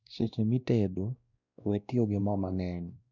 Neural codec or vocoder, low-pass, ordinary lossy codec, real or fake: codec, 16 kHz, 1 kbps, X-Codec, WavLM features, trained on Multilingual LibriSpeech; 7.2 kHz; none; fake